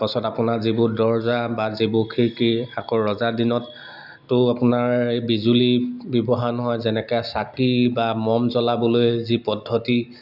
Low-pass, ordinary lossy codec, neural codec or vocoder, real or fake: 5.4 kHz; none; none; real